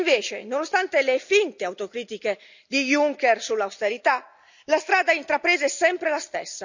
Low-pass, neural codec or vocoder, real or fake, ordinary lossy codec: 7.2 kHz; none; real; none